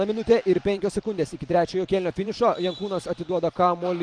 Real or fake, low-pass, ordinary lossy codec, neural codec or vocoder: fake; 9.9 kHz; Opus, 24 kbps; vocoder, 44.1 kHz, 128 mel bands every 512 samples, BigVGAN v2